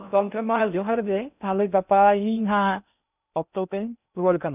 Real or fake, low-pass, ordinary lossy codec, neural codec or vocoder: fake; 3.6 kHz; none; codec, 16 kHz in and 24 kHz out, 0.6 kbps, FocalCodec, streaming, 2048 codes